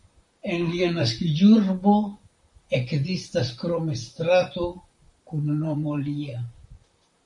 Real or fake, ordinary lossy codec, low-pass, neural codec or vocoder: fake; MP3, 48 kbps; 10.8 kHz; vocoder, 44.1 kHz, 128 mel bands, Pupu-Vocoder